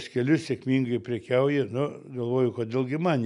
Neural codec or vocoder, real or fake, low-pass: none; real; 10.8 kHz